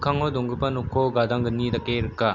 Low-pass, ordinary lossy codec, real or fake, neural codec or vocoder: 7.2 kHz; none; real; none